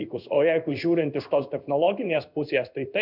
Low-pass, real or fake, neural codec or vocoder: 5.4 kHz; fake; codec, 16 kHz in and 24 kHz out, 1 kbps, XY-Tokenizer